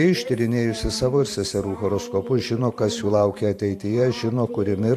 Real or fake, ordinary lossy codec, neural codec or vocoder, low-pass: fake; AAC, 64 kbps; vocoder, 44.1 kHz, 128 mel bands every 512 samples, BigVGAN v2; 14.4 kHz